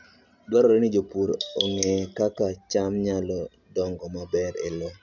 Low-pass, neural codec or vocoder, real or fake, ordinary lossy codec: 7.2 kHz; none; real; none